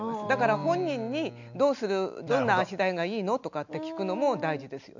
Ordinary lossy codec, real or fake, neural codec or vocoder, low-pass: none; real; none; 7.2 kHz